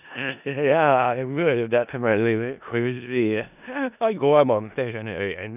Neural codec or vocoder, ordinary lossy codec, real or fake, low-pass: codec, 16 kHz in and 24 kHz out, 0.4 kbps, LongCat-Audio-Codec, four codebook decoder; none; fake; 3.6 kHz